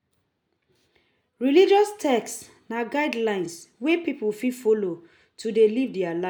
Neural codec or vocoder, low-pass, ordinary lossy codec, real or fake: none; none; none; real